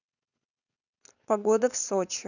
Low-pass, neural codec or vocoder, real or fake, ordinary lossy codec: 7.2 kHz; codec, 16 kHz, 4.8 kbps, FACodec; fake; none